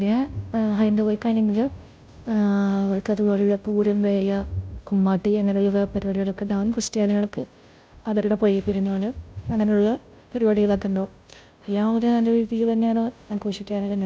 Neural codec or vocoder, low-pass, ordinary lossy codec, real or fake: codec, 16 kHz, 0.5 kbps, FunCodec, trained on Chinese and English, 25 frames a second; none; none; fake